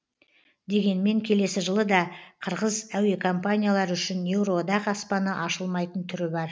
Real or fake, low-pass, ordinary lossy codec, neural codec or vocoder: real; none; none; none